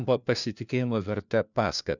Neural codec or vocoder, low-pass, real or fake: codec, 16 kHz, 1 kbps, FunCodec, trained on LibriTTS, 50 frames a second; 7.2 kHz; fake